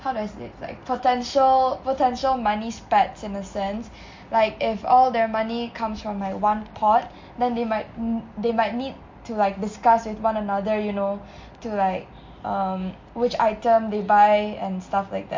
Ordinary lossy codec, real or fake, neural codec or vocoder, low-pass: MP3, 48 kbps; real; none; 7.2 kHz